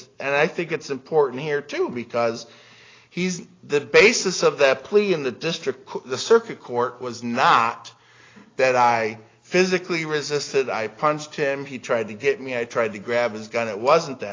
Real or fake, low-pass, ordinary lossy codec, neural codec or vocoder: real; 7.2 kHz; AAC, 32 kbps; none